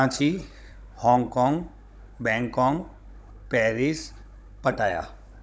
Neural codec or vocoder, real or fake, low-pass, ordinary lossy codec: codec, 16 kHz, 16 kbps, FunCodec, trained on Chinese and English, 50 frames a second; fake; none; none